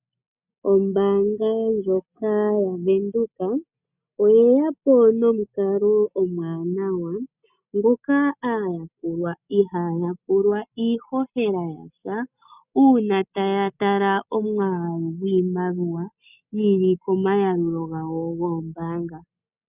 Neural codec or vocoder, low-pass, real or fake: none; 3.6 kHz; real